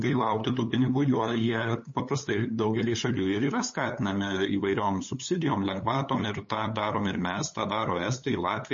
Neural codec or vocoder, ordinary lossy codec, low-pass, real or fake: codec, 16 kHz, 8 kbps, FunCodec, trained on LibriTTS, 25 frames a second; MP3, 32 kbps; 7.2 kHz; fake